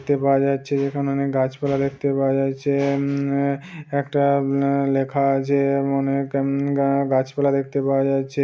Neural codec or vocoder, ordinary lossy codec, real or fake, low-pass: none; none; real; none